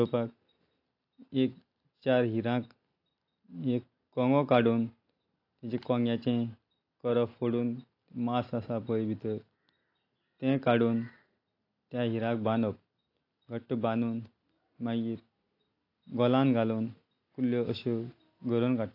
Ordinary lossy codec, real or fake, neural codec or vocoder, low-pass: AAC, 32 kbps; real; none; 5.4 kHz